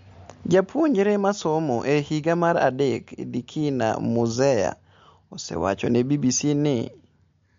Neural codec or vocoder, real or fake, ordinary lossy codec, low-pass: none; real; MP3, 48 kbps; 7.2 kHz